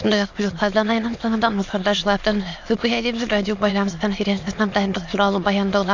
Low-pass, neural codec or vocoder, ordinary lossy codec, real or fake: 7.2 kHz; autoencoder, 22.05 kHz, a latent of 192 numbers a frame, VITS, trained on many speakers; AAC, 48 kbps; fake